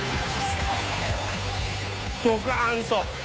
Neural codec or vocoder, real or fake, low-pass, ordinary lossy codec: codec, 16 kHz, 2 kbps, FunCodec, trained on Chinese and English, 25 frames a second; fake; none; none